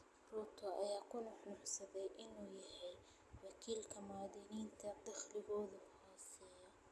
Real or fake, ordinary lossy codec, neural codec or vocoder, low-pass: real; none; none; none